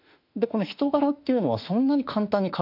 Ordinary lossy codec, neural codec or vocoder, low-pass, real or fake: none; autoencoder, 48 kHz, 32 numbers a frame, DAC-VAE, trained on Japanese speech; 5.4 kHz; fake